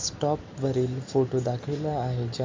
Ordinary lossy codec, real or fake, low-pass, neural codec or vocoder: MP3, 48 kbps; real; 7.2 kHz; none